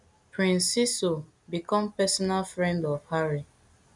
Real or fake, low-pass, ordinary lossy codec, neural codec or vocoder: real; 10.8 kHz; none; none